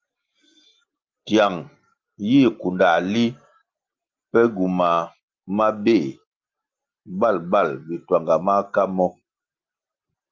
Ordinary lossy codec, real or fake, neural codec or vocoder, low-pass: Opus, 24 kbps; real; none; 7.2 kHz